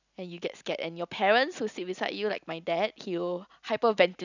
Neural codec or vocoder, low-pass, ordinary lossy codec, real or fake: none; 7.2 kHz; none; real